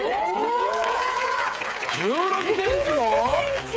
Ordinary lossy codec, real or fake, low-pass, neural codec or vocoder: none; fake; none; codec, 16 kHz, 8 kbps, FreqCodec, smaller model